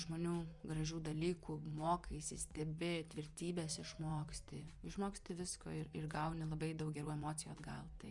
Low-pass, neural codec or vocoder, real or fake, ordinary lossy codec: 10.8 kHz; vocoder, 24 kHz, 100 mel bands, Vocos; fake; Opus, 64 kbps